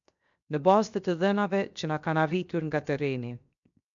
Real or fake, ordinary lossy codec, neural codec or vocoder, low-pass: fake; MP3, 64 kbps; codec, 16 kHz, 0.7 kbps, FocalCodec; 7.2 kHz